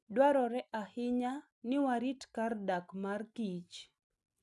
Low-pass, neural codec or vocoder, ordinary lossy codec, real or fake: none; none; none; real